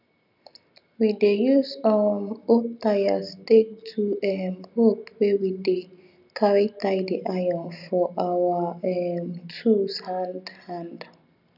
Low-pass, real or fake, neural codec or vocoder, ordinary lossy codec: 5.4 kHz; real; none; none